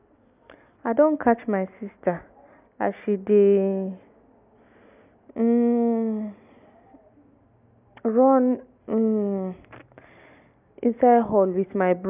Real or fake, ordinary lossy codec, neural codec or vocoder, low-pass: real; none; none; 3.6 kHz